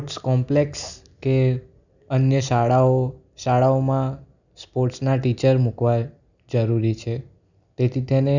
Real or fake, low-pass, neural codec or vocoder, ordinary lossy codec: real; 7.2 kHz; none; none